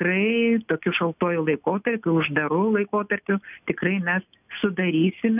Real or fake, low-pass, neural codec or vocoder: real; 3.6 kHz; none